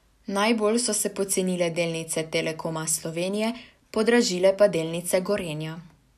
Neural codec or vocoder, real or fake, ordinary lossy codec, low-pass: none; real; none; 14.4 kHz